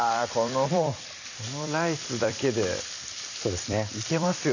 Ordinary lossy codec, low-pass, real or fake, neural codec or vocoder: none; 7.2 kHz; fake; vocoder, 44.1 kHz, 128 mel bands every 256 samples, BigVGAN v2